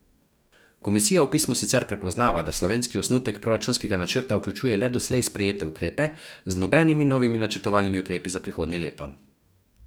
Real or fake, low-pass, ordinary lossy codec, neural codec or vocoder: fake; none; none; codec, 44.1 kHz, 2.6 kbps, DAC